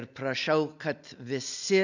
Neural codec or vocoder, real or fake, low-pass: none; real; 7.2 kHz